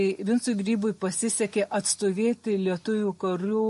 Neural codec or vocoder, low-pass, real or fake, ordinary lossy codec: none; 14.4 kHz; real; MP3, 48 kbps